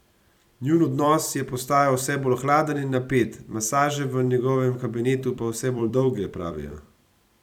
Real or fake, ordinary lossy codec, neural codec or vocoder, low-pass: fake; none; vocoder, 44.1 kHz, 128 mel bands every 256 samples, BigVGAN v2; 19.8 kHz